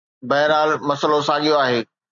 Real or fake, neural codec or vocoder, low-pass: real; none; 7.2 kHz